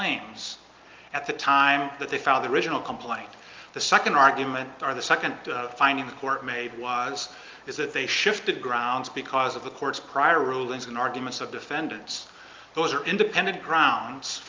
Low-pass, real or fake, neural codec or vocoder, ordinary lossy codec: 7.2 kHz; real; none; Opus, 24 kbps